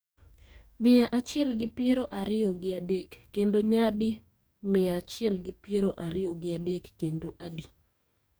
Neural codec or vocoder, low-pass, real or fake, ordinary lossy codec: codec, 44.1 kHz, 2.6 kbps, DAC; none; fake; none